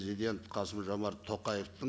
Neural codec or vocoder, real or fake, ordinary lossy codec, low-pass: none; real; none; none